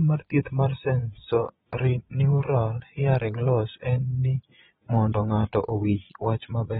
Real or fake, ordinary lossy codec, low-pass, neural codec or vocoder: fake; AAC, 16 kbps; 9.9 kHz; vocoder, 22.05 kHz, 80 mel bands, Vocos